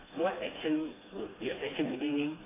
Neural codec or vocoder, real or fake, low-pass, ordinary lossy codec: codec, 16 kHz, 2 kbps, FreqCodec, smaller model; fake; 3.6 kHz; AAC, 16 kbps